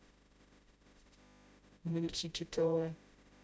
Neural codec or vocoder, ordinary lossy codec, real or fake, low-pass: codec, 16 kHz, 0.5 kbps, FreqCodec, smaller model; none; fake; none